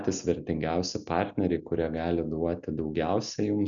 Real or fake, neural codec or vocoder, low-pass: real; none; 7.2 kHz